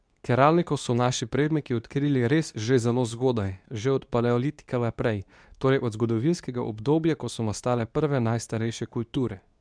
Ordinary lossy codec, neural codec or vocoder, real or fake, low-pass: none; codec, 24 kHz, 0.9 kbps, WavTokenizer, medium speech release version 2; fake; 9.9 kHz